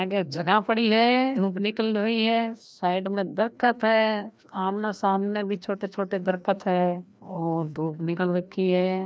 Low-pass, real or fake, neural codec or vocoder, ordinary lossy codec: none; fake; codec, 16 kHz, 1 kbps, FreqCodec, larger model; none